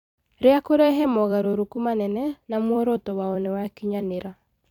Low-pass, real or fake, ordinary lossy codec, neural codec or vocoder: 19.8 kHz; fake; none; vocoder, 44.1 kHz, 128 mel bands every 256 samples, BigVGAN v2